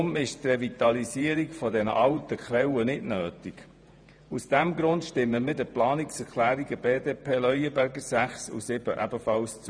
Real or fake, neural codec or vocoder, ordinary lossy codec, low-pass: fake; vocoder, 48 kHz, 128 mel bands, Vocos; none; 9.9 kHz